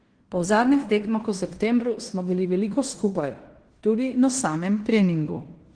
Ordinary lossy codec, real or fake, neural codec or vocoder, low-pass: Opus, 16 kbps; fake; codec, 16 kHz in and 24 kHz out, 0.9 kbps, LongCat-Audio-Codec, fine tuned four codebook decoder; 9.9 kHz